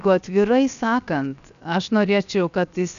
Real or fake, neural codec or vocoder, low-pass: fake; codec, 16 kHz, 0.7 kbps, FocalCodec; 7.2 kHz